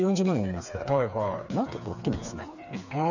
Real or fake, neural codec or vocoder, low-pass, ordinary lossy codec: fake; codec, 16 kHz, 4 kbps, FreqCodec, smaller model; 7.2 kHz; none